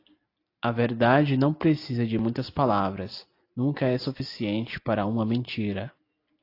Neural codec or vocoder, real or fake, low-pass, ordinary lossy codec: none; real; 5.4 kHz; AAC, 32 kbps